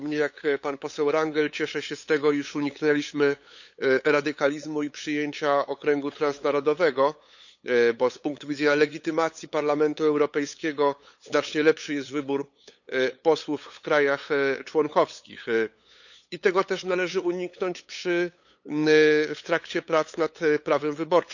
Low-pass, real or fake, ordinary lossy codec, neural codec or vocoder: 7.2 kHz; fake; none; codec, 16 kHz, 8 kbps, FunCodec, trained on LibriTTS, 25 frames a second